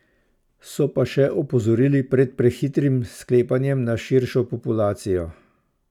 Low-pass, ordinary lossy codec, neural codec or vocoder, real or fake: 19.8 kHz; none; vocoder, 44.1 kHz, 128 mel bands every 256 samples, BigVGAN v2; fake